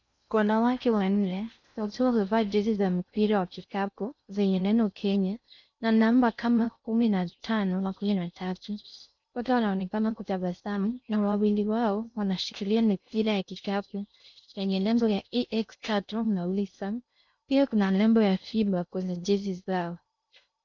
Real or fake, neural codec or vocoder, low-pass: fake; codec, 16 kHz in and 24 kHz out, 0.6 kbps, FocalCodec, streaming, 2048 codes; 7.2 kHz